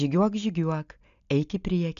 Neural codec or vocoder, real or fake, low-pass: none; real; 7.2 kHz